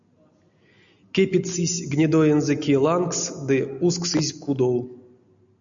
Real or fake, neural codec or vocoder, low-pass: real; none; 7.2 kHz